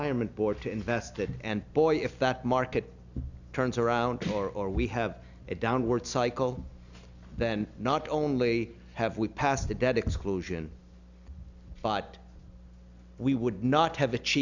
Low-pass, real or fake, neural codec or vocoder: 7.2 kHz; real; none